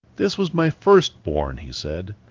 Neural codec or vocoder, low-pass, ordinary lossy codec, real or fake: codec, 16 kHz in and 24 kHz out, 1 kbps, XY-Tokenizer; 7.2 kHz; Opus, 32 kbps; fake